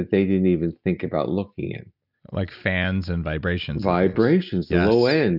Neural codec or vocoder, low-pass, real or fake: none; 5.4 kHz; real